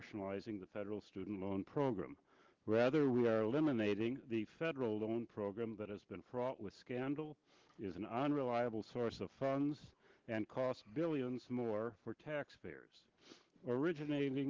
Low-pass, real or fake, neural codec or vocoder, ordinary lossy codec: 7.2 kHz; real; none; Opus, 16 kbps